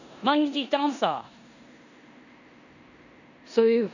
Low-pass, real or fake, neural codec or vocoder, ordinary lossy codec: 7.2 kHz; fake; codec, 16 kHz in and 24 kHz out, 0.9 kbps, LongCat-Audio-Codec, four codebook decoder; none